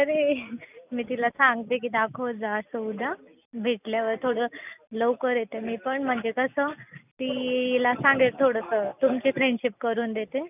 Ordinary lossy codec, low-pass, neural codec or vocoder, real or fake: none; 3.6 kHz; none; real